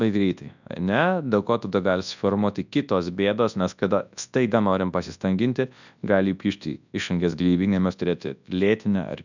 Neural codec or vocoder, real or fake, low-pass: codec, 24 kHz, 0.9 kbps, WavTokenizer, large speech release; fake; 7.2 kHz